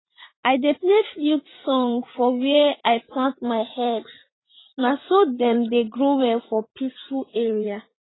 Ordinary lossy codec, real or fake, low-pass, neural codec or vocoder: AAC, 16 kbps; fake; 7.2 kHz; vocoder, 44.1 kHz, 80 mel bands, Vocos